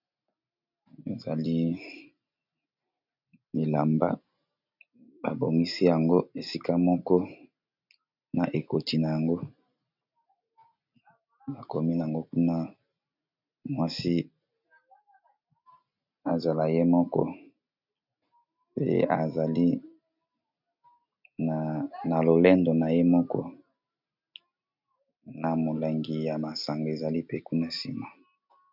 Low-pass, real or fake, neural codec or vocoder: 5.4 kHz; real; none